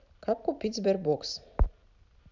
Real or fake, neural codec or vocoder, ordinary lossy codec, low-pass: real; none; none; 7.2 kHz